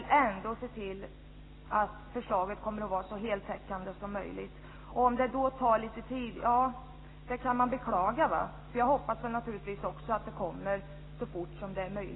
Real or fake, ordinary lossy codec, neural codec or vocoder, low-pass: real; AAC, 16 kbps; none; 7.2 kHz